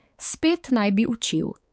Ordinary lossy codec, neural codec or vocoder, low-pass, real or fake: none; codec, 16 kHz, 4 kbps, X-Codec, HuBERT features, trained on balanced general audio; none; fake